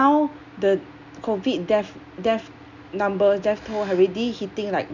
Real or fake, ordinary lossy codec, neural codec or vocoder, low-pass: real; none; none; 7.2 kHz